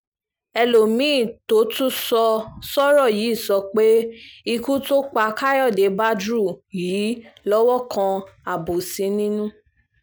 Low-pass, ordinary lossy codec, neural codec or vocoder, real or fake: none; none; none; real